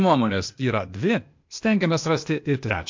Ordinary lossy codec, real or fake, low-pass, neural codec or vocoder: MP3, 48 kbps; fake; 7.2 kHz; codec, 16 kHz, 0.8 kbps, ZipCodec